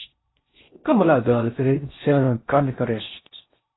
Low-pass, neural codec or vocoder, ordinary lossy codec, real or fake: 7.2 kHz; codec, 16 kHz in and 24 kHz out, 0.6 kbps, FocalCodec, streaming, 4096 codes; AAC, 16 kbps; fake